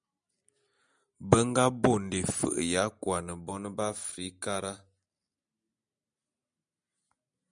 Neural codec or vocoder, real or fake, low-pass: none; real; 9.9 kHz